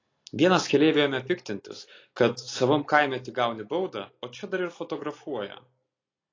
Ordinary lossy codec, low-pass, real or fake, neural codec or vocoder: AAC, 32 kbps; 7.2 kHz; real; none